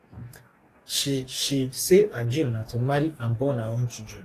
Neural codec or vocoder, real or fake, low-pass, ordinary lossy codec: codec, 44.1 kHz, 2.6 kbps, DAC; fake; 14.4 kHz; AAC, 48 kbps